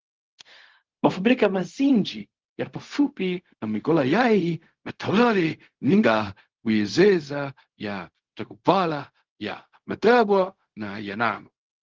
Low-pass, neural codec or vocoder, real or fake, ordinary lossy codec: 7.2 kHz; codec, 16 kHz, 0.4 kbps, LongCat-Audio-Codec; fake; Opus, 16 kbps